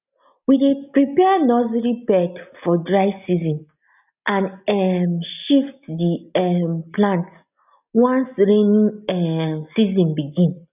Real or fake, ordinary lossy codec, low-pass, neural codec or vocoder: real; none; 3.6 kHz; none